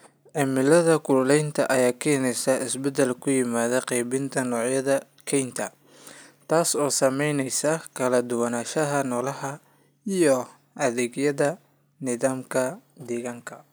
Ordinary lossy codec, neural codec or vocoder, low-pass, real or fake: none; none; none; real